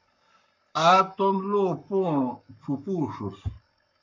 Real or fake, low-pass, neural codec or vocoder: fake; 7.2 kHz; codec, 44.1 kHz, 7.8 kbps, Pupu-Codec